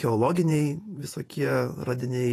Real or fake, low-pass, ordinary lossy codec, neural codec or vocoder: fake; 14.4 kHz; AAC, 48 kbps; vocoder, 48 kHz, 128 mel bands, Vocos